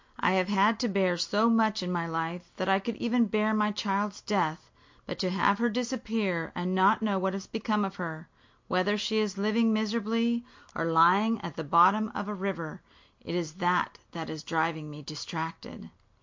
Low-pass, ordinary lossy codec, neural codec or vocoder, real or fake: 7.2 kHz; MP3, 48 kbps; none; real